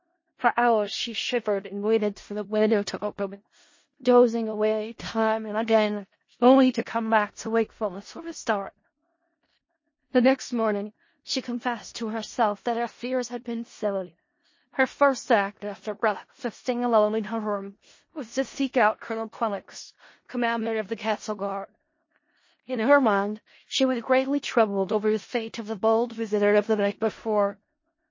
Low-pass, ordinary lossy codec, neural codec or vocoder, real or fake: 7.2 kHz; MP3, 32 kbps; codec, 16 kHz in and 24 kHz out, 0.4 kbps, LongCat-Audio-Codec, four codebook decoder; fake